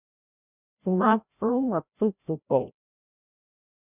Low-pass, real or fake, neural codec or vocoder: 3.6 kHz; fake; codec, 16 kHz, 0.5 kbps, FreqCodec, larger model